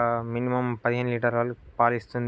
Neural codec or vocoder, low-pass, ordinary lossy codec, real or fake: none; none; none; real